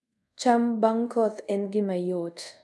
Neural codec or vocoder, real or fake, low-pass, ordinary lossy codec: codec, 24 kHz, 0.5 kbps, DualCodec; fake; none; none